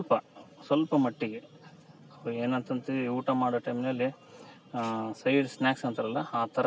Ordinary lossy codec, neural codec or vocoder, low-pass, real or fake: none; none; none; real